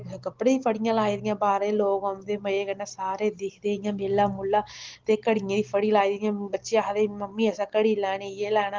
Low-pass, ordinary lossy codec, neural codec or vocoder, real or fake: 7.2 kHz; Opus, 16 kbps; none; real